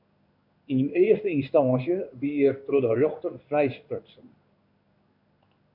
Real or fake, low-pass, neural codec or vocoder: fake; 5.4 kHz; codec, 16 kHz in and 24 kHz out, 1 kbps, XY-Tokenizer